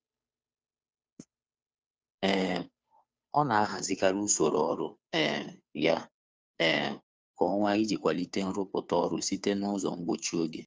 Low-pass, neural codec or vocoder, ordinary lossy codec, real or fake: none; codec, 16 kHz, 2 kbps, FunCodec, trained on Chinese and English, 25 frames a second; none; fake